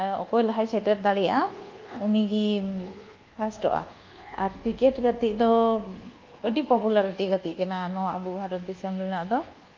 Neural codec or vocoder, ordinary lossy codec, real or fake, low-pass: codec, 24 kHz, 1.2 kbps, DualCodec; Opus, 24 kbps; fake; 7.2 kHz